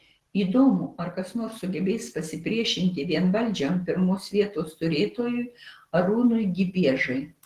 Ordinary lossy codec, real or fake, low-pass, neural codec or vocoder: Opus, 16 kbps; real; 14.4 kHz; none